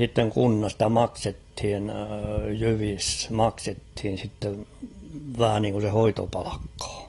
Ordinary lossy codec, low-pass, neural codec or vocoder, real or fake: AAC, 32 kbps; 10.8 kHz; none; real